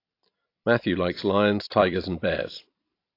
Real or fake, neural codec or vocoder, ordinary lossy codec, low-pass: fake; vocoder, 44.1 kHz, 128 mel bands every 256 samples, BigVGAN v2; AAC, 32 kbps; 5.4 kHz